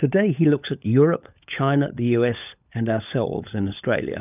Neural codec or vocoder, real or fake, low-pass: codec, 16 kHz, 16 kbps, FunCodec, trained on LibriTTS, 50 frames a second; fake; 3.6 kHz